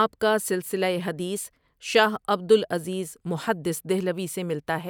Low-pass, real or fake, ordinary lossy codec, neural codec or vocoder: none; real; none; none